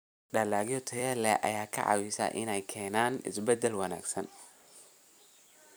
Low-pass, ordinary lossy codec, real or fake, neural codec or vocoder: none; none; fake; vocoder, 44.1 kHz, 128 mel bands every 256 samples, BigVGAN v2